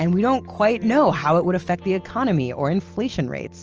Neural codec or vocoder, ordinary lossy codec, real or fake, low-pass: none; Opus, 24 kbps; real; 7.2 kHz